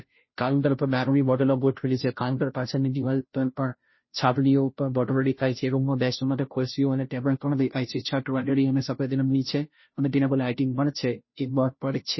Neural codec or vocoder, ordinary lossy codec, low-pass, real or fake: codec, 16 kHz, 0.5 kbps, FunCodec, trained on Chinese and English, 25 frames a second; MP3, 24 kbps; 7.2 kHz; fake